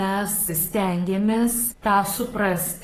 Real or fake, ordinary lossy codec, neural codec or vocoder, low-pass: fake; AAC, 48 kbps; codec, 44.1 kHz, 3.4 kbps, Pupu-Codec; 14.4 kHz